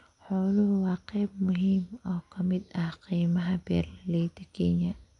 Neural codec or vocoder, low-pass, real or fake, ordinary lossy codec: none; 10.8 kHz; real; none